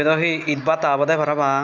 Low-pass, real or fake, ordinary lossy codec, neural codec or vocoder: 7.2 kHz; real; none; none